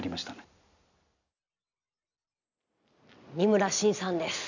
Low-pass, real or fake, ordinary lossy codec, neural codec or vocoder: 7.2 kHz; real; none; none